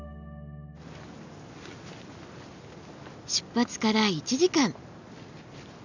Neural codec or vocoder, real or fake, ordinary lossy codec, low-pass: none; real; none; 7.2 kHz